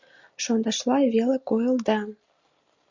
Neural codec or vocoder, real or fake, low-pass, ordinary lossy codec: none; real; 7.2 kHz; Opus, 64 kbps